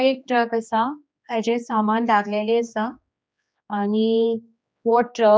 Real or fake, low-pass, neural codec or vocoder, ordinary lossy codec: fake; none; codec, 16 kHz, 2 kbps, X-Codec, HuBERT features, trained on general audio; none